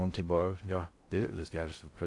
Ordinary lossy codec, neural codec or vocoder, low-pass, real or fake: AAC, 48 kbps; codec, 16 kHz in and 24 kHz out, 0.6 kbps, FocalCodec, streaming, 4096 codes; 10.8 kHz; fake